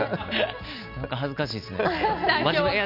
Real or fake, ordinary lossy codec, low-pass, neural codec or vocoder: real; none; 5.4 kHz; none